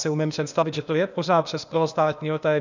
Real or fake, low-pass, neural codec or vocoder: fake; 7.2 kHz; codec, 16 kHz, 0.8 kbps, ZipCodec